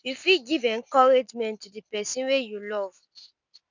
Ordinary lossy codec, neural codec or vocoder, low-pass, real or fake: none; none; 7.2 kHz; real